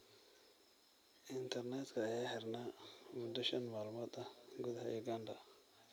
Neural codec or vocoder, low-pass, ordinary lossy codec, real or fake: none; none; none; real